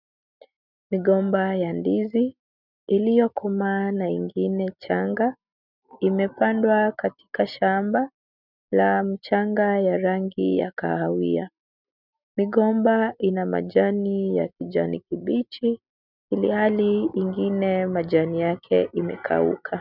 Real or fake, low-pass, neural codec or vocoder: real; 5.4 kHz; none